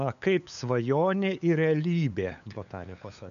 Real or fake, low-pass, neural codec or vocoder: fake; 7.2 kHz; codec, 16 kHz, 8 kbps, FunCodec, trained on LibriTTS, 25 frames a second